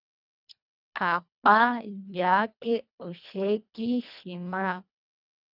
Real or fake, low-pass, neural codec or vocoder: fake; 5.4 kHz; codec, 24 kHz, 1.5 kbps, HILCodec